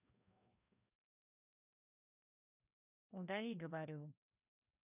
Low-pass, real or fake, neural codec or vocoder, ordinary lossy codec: 3.6 kHz; fake; codec, 16 kHz, 1 kbps, FreqCodec, larger model; none